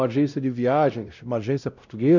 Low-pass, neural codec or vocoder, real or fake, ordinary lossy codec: 7.2 kHz; codec, 16 kHz, 0.5 kbps, X-Codec, WavLM features, trained on Multilingual LibriSpeech; fake; none